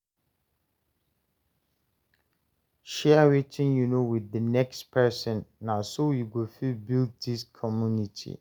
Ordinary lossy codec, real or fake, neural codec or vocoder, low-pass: none; real; none; none